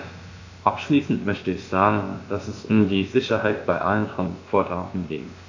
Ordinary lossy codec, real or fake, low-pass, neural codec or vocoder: AAC, 48 kbps; fake; 7.2 kHz; codec, 16 kHz, about 1 kbps, DyCAST, with the encoder's durations